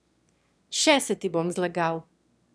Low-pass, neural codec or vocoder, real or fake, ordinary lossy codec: none; autoencoder, 22.05 kHz, a latent of 192 numbers a frame, VITS, trained on one speaker; fake; none